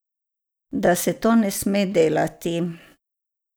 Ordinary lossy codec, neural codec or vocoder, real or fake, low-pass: none; none; real; none